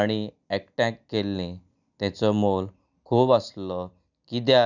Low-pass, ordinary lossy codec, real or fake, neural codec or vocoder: 7.2 kHz; none; real; none